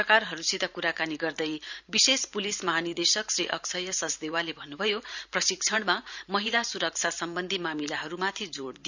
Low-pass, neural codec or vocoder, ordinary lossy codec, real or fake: 7.2 kHz; vocoder, 44.1 kHz, 128 mel bands every 512 samples, BigVGAN v2; none; fake